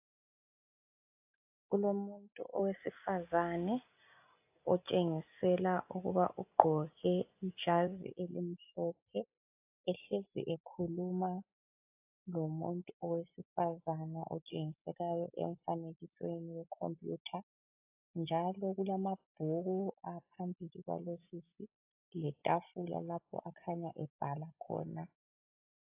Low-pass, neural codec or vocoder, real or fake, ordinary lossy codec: 3.6 kHz; none; real; AAC, 32 kbps